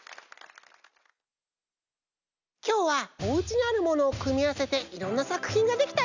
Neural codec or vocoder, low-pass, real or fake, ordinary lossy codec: none; 7.2 kHz; real; none